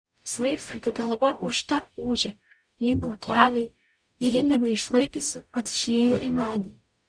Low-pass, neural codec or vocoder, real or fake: 9.9 kHz; codec, 44.1 kHz, 0.9 kbps, DAC; fake